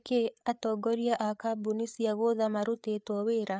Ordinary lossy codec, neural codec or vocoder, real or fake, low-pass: none; codec, 16 kHz, 8 kbps, FreqCodec, larger model; fake; none